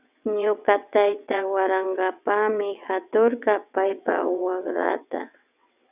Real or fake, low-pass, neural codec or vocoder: fake; 3.6 kHz; vocoder, 22.05 kHz, 80 mel bands, WaveNeXt